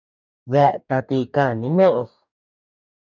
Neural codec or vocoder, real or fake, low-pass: codec, 44.1 kHz, 2.6 kbps, DAC; fake; 7.2 kHz